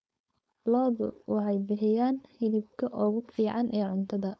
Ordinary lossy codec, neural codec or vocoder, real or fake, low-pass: none; codec, 16 kHz, 4.8 kbps, FACodec; fake; none